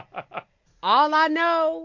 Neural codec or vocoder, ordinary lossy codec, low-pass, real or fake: none; MP3, 48 kbps; 7.2 kHz; real